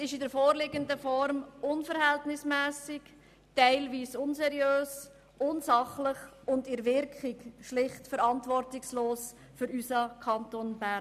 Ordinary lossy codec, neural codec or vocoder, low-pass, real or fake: none; none; 14.4 kHz; real